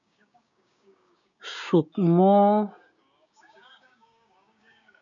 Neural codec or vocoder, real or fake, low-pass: codec, 16 kHz, 6 kbps, DAC; fake; 7.2 kHz